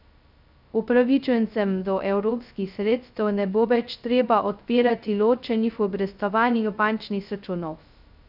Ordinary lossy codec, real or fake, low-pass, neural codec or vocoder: none; fake; 5.4 kHz; codec, 16 kHz, 0.2 kbps, FocalCodec